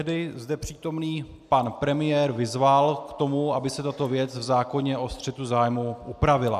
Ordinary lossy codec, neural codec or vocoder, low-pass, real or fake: MP3, 96 kbps; none; 14.4 kHz; real